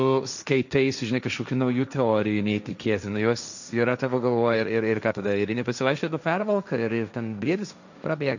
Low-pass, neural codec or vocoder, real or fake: 7.2 kHz; codec, 16 kHz, 1.1 kbps, Voila-Tokenizer; fake